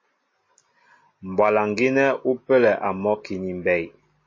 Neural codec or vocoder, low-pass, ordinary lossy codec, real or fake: none; 7.2 kHz; MP3, 32 kbps; real